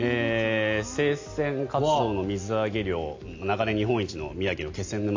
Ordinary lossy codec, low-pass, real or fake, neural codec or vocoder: AAC, 48 kbps; 7.2 kHz; real; none